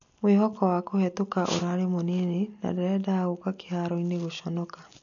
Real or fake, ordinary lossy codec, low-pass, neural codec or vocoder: real; none; 7.2 kHz; none